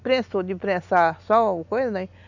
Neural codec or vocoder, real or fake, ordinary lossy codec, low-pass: none; real; none; 7.2 kHz